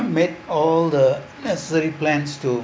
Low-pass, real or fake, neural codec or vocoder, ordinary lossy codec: none; real; none; none